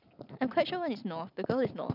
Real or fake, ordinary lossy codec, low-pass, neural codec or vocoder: real; none; 5.4 kHz; none